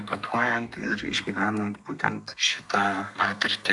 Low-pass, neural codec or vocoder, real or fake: 10.8 kHz; codec, 32 kHz, 1.9 kbps, SNAC; fake